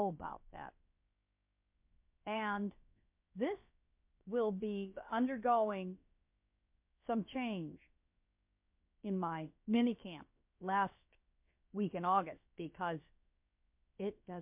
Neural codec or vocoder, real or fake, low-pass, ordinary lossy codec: codec, 16 kHz, about 1 kbps, DyCAST, with the encoder's durations; fake; 3.6 kHz; MP3, 32 kbps